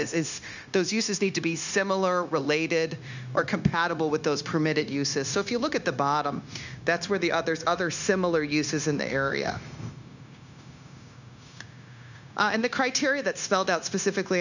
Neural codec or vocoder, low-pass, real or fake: codec, 16 kHz, 0.9 kbps, LongCat-Audio-Codec; 7.2 kHz; fake